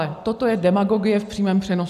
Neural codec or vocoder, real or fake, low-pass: none; real; 14.4 kHz